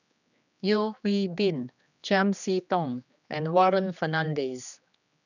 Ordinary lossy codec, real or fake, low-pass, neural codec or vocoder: none; fake; 7.2 kHz; codec, 16 kHz, 2 kbps, X-Codec, HuBERT features, trained on general audio